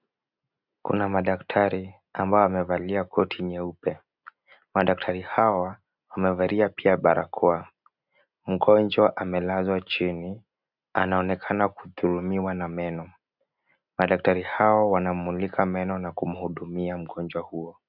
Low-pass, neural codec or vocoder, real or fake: 5.4 kHz; none; real